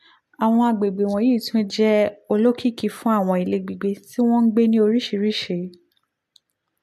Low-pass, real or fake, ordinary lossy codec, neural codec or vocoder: 14.4 kHz; real; MP3, 64 kbps; none